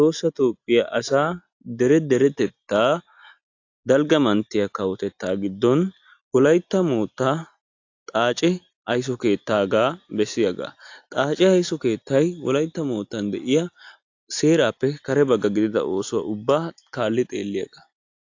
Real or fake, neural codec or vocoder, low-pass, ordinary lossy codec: real; none; 7.2 kHz; AAC, 48 kbps